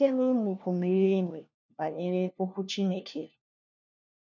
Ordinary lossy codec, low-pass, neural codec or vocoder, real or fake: none; 7.2 kHz; codec, 16 kHz, 1 kbps, FunCodec, trained on LibriTTS, 50 frames a second; fake